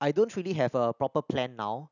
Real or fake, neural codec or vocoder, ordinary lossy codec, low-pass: real; none; none; 7.2 kHz